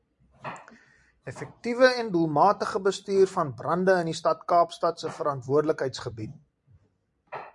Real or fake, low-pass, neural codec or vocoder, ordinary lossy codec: fake; 10.8 kHz; vocoder, 44.1 kHz, 128 mel bands every 256 samples, BigVGAN v2; MP3, 96 kbps